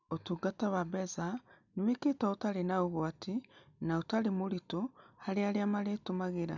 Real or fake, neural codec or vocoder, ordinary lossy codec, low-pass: real; none; none; 7.2 kHz